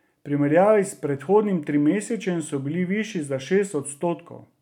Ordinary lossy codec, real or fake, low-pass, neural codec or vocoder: none; real; 19.8 kHz; none